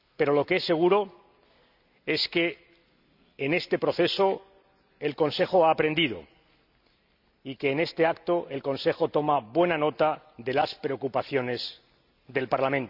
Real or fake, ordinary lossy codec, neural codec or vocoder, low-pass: real; none; none; 5.4 kHz